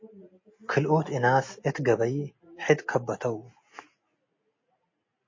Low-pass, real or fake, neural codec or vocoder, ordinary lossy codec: 7.2 kHz; real; none; MP3, 32 kbps